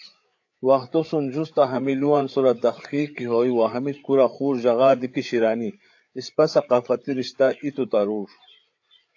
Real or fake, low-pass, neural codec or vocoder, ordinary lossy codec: fake; 7.2 kHz; codec, 16 kHz, 8 kbps, FreqCodec, larger model; AAC, 48 kbps